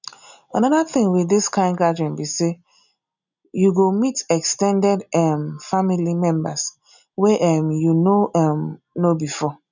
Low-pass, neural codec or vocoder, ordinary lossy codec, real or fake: 7.2 kHz; none; none; real